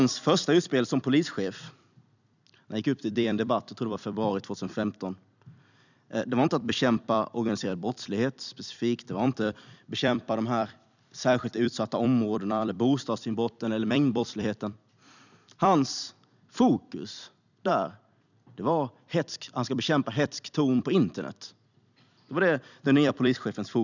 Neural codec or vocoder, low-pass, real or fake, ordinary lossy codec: vocoder, 44.1 kHz, 128 mel bands every 256 samples, BigVGAN v2; 7.2 kHz; fake; none